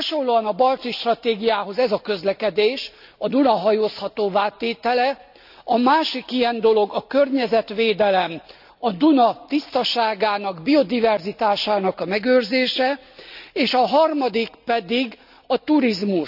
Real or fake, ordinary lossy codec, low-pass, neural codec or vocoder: real; none; 5.4 kHz; none